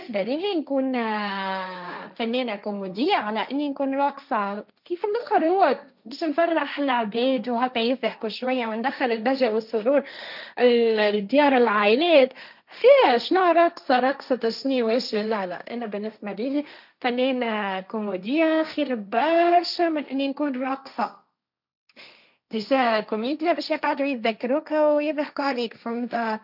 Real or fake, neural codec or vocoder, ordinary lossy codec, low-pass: fake; codec, 16 kHz, 1.1 kbps, Voila-Tokenizer; none; 5.4 kHz